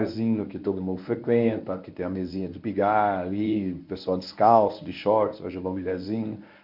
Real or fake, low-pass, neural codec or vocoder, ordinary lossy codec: fake; 5.4 kHz; codec, 24 kHz, 0.9 kbps, WavTokenizer, medium speech release version 1; MP3, 48 kbps